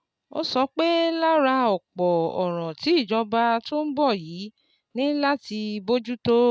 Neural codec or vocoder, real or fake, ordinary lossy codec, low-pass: none; real; none; none